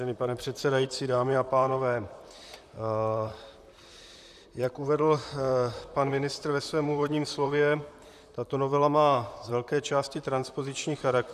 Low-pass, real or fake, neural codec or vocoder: 14.4 kHz; fake; vocoder, 44.1 kHz, 128 mel bands, Pupu-Vocoder